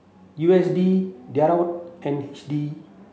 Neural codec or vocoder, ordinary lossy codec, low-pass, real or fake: none; none; none; real